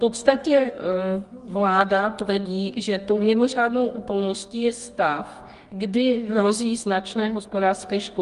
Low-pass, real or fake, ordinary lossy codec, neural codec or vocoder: 10.8 kHz; fake; Opus, 32 kbps; codec, 24 kHz, 0.9 kbps, WavTokenizer, medium music audio release